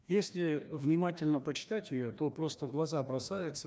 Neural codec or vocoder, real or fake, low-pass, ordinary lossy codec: codec, 16 kHz, 1 kbps, FreqCodec, larger model; fake; none; none